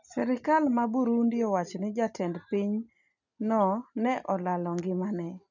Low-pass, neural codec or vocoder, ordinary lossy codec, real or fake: 7.2 kHz; none; none; real